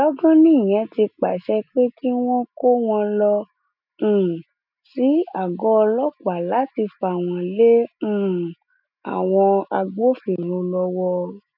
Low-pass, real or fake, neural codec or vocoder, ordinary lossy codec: 5.4 kHz; real; none; none